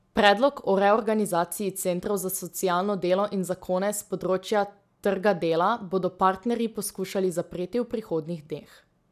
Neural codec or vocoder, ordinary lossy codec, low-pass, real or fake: none; none; 14.4 kHz; real